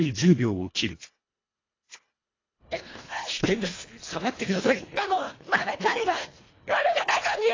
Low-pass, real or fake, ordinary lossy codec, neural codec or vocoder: 7.2 kHz; fake; AAC, 32 kbps; codec, 24 kHz, 1.5 kbps, HILCodec